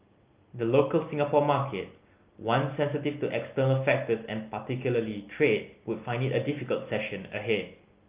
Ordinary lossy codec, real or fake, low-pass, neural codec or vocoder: Opus, 24 kbps; real; 3.6 kHz; none